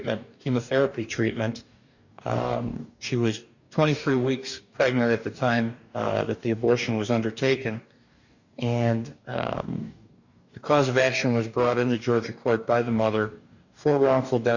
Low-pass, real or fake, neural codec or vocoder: 7.2 kHz; fake; codec, 44.1 kHz, 2.6 kbps, DAC